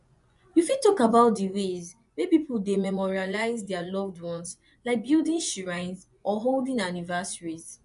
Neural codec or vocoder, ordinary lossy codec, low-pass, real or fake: vocoder, 24 kHz, 100 mel bands, Vocos; none; 10.8 kHz; fake